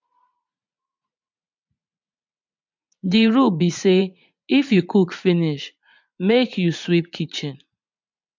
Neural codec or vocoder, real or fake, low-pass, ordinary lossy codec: vocoder, 44.1 kHz, 80 mel bands, Vocos; fake; 7.2 kHz; MP3, 64 kbps